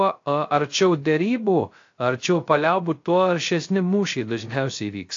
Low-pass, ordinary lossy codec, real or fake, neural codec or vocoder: 7.2 kHz; AAC, 48 kbps; fake; codec, 16 kHz, 0.3 kbps, FocalCodec